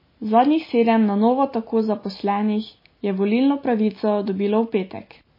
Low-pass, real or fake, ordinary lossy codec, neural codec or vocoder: 5.4 kHz; real; MP3, 24 kbps; none